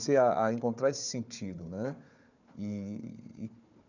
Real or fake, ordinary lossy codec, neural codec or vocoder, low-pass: fake; none; codec, 16 kHz, 4 kbps, FunCodec, trained on Chinese and English, 50 frames a second; 7.2 kHz